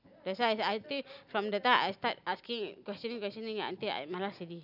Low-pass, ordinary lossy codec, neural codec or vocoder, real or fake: 5.4 kHz; none; none; real